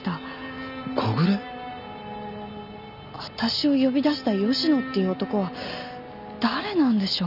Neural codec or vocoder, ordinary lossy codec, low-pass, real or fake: none; none; 5.4 kHz; real